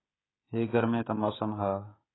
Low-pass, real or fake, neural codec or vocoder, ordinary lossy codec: 7.2 kHz; fake; codec, 16 kHz, 16 kbps, FreqCodec, smaller model; AAC, 16 kbps